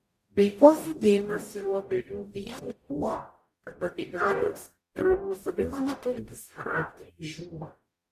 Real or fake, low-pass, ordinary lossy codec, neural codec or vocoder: fake; 14.4 kHz; Opus, 64 kbps; codec, 44.1 kHz, 0.9 kbps, DAC